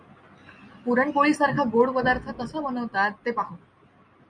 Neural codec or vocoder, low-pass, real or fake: none; 9.9 kHz; real